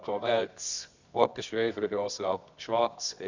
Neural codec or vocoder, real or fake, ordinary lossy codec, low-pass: codec, 24 kHz, 0.9 kbps, WavTokenizer, medium music audio release; fake; Opus, 64 kbps; 7.2 kHz